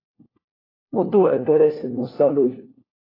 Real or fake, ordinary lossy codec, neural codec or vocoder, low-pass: fake; AAC, 24 kbps; codec, 16 kHz, 1 kbps, FunCodec, trained on LibriTTS, 50 frames a second; 5.4 kHz